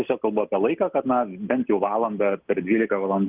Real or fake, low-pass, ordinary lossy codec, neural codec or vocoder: real; 3.6 kHz; Opus, 32 kbps; none